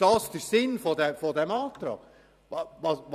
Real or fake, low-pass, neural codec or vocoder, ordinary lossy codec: fake; 14.4 kHz; vocoder, 44.1 kHz, 128 mel bands every 512 samples, BigVGAN v2; none